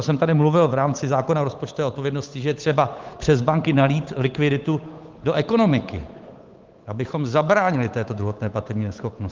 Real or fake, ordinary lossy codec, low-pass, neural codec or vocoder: fake; Opus, 32 kbps; 7.2 kHz; codec, 16 kHz, 8 kbps, FunCodec, trained on Chinese and English, 25 frames a second